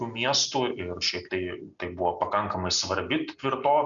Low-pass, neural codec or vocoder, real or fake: 7.2 kHz; none; real